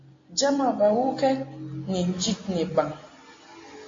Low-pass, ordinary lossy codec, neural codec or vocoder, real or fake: 7.2 kHz; AAC, 32 kbps; none; real